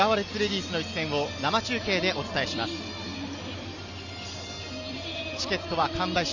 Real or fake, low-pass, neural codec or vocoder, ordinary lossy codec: real; 7.2 kHz; none; none